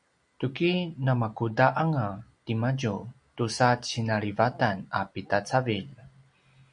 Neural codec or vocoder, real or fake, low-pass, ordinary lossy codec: none; real; 9.9 kHz; MP3, 96 kbps